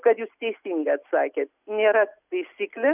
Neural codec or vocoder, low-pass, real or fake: none; 3.6 kHz; real